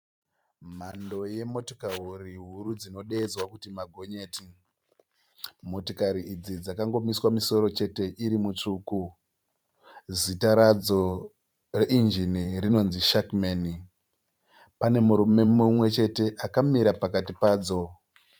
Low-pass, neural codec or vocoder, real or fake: 19.8 kHz; vocoder, 44.1 kHz, 128 mel bands every 512 samples, BigVGAN v2; fake